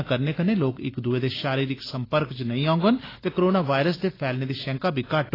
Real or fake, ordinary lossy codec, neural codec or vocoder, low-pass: real; AAC, 24 kbps; none; 5.4 kHz